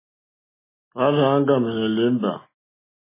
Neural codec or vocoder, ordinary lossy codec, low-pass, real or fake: none; MP3, 16 kbps; 3.6 kHz; real